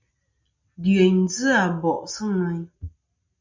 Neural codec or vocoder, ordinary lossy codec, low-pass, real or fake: none; MP3, 64 kbps; 7.2 kHz; real